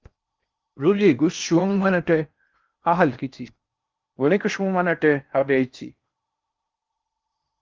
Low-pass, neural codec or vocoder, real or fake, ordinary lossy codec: 7.2 kHz; codec, 16 kHz in and 24 kHz out, 0.6 kbps, FocalCodec, streaming, 4096 codes; fake; Opus, 32 kbps